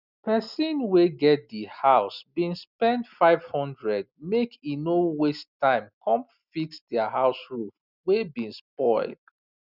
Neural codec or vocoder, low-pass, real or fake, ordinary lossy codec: none; 5.4 kHz; real; none